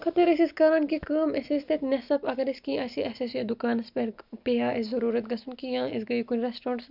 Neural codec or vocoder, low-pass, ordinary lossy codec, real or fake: none; 5.4 kHz; none; real